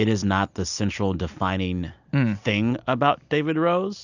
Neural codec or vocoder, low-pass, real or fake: none; 7.2 kHz; real